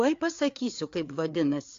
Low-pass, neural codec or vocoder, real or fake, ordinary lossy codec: 7.2 kHz; codec, 16 kHz, 4 kbps, FreqCodec, larger model; fake; AAC, 48 kbps